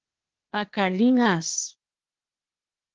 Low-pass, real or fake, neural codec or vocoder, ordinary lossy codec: 7.2 kHz; fake; codec, 16 kHz, 0.8 kbps, ZipCodec; Opus, 16 kbps